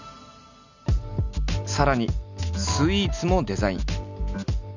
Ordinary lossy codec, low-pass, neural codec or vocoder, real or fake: none; 7.2 kHz; none; real